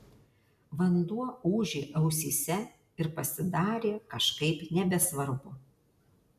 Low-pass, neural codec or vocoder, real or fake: 14.4 kHz; none; real